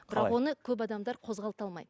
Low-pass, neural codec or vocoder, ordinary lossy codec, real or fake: none; none; none; real